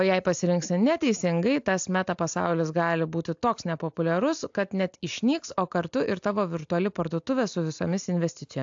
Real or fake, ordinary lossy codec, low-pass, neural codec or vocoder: real; AAC, 64 kbps; 7.2 kHz; none